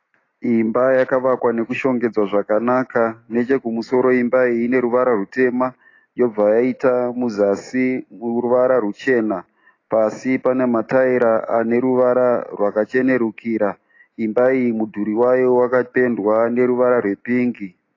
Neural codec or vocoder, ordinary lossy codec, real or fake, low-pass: none; AAC, 32 kbps; real; 7.2 kHz